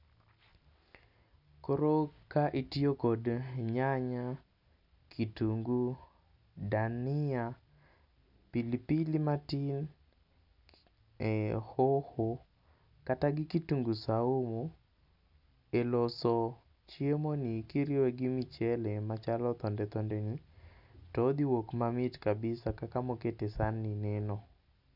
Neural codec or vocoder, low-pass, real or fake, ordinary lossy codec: none; 5.4 kHz; real; none